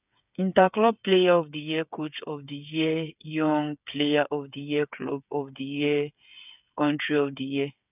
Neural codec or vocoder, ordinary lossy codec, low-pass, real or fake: codec, 16 kHz, 8 kbps, FreqCodec, smaller model; none; 3.6 kHz; fake